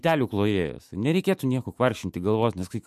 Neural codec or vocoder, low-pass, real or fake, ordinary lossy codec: autoencoder, 48 kHz, 128 numbers a frame, DAC-VAE, trained on Japanese speech; 14.4 kHz; fake; MP3, 64 kbps